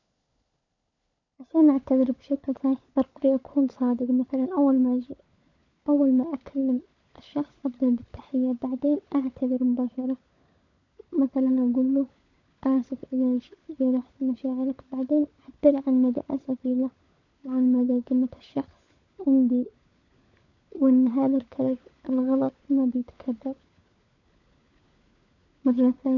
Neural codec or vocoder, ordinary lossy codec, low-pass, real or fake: codec, 16 kHz, 16 kbps, FunCodec, trained on LibriTTS, 50 frames a second; none; 7.2 kHz; fake